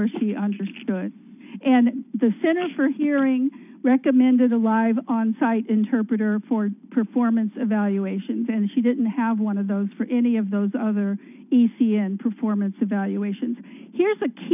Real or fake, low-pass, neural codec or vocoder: real; 3.6 kHz; none